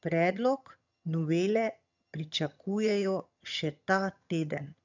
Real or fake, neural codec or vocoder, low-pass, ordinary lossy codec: fake; vocoder, 22.05 kHz, 80 mel bands, HiFi-GAN; 7.2 kHz; none